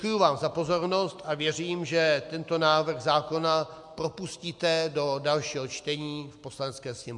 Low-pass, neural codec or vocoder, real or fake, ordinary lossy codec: 10.8 kHz; none; real; MP3, 64 kbps